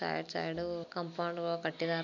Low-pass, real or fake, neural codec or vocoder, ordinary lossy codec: 7.2 kHz; real; none; none